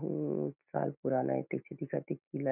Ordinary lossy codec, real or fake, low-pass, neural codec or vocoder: none; real; 3.6 kHz; none